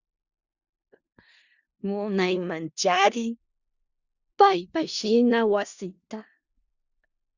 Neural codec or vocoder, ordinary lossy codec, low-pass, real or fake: codec, 16 kHz in and 24 kHz out, 0.4 kbps, LongCat-Audio-Codec, four codebook decoder; Opus, 64 kbps; 7.2 kHz; fake